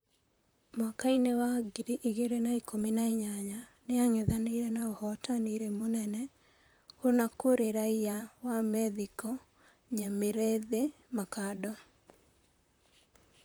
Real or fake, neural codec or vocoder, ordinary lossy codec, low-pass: fake; vocoder, 44.1 kHz, 128 mel bands, Pupu-Vocoder; none; none